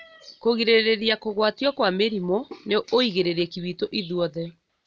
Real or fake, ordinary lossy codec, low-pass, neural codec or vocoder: real; none; none; none